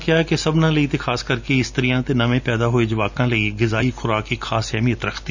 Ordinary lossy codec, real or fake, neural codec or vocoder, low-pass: none; real; none; 7.2 kHz